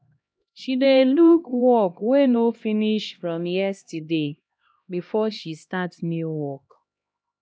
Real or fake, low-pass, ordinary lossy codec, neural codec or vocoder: fake; none; none; codec, 16 kHz, 1 kbps, X-Codec, HuBERT features, trained on LibriSpeech